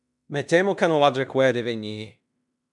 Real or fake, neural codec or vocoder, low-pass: fake; codec, 16 kHz in and 24 kHz out, 0.9 kbps, LongCat-Audio-Codec, fine tuned four codebook decoder; 10.8 kHz